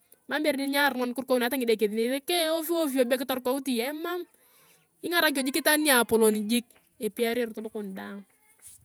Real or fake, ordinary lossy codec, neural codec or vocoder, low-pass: fake; none; vocoder, 48 kHz, 128 mel bands, Vocos; none